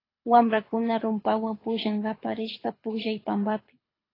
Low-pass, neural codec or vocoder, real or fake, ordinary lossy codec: 5.4 kHz; codec, 24 kHz, 6 kbps, HILCodec; fake; AAC, 24 kbps